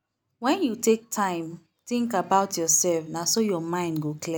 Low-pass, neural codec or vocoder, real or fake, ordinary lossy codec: none; none; real; none